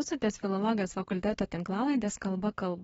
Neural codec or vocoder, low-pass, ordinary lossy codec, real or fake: codec, 44.1 kHz, 7.8 kbps, DAC; 19.8 kHz; AAC, 24 kbps; fake